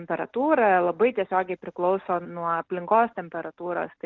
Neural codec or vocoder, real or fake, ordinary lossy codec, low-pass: none; real; Opus, 32 kbps; 7.2 kHz